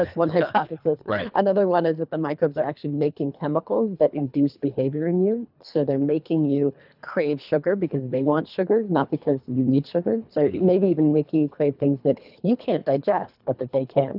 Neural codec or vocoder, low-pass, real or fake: codec, 24 kHz, 3 kbps, HILCodec; 5.4 kHz; fake